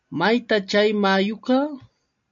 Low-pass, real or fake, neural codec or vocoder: 7.2 kHz; real; none